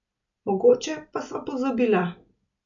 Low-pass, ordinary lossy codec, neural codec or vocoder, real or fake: 7.2 kHz; none; none; real